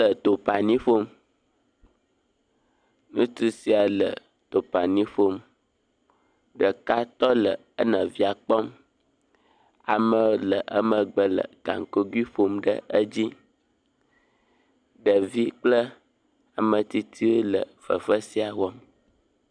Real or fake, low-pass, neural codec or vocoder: real; 9.9 kHz; none